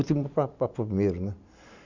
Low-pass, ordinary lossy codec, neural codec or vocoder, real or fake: 7.2 kHz; none; none; real